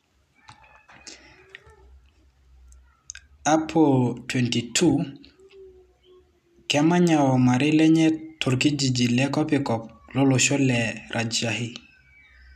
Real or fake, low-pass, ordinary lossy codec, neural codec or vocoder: real; 14.4 kHz; none; none